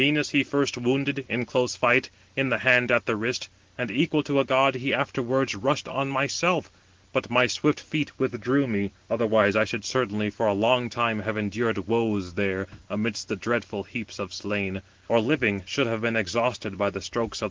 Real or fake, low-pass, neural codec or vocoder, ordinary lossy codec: real; 7.2 kHz; none; Opus, 16 kbps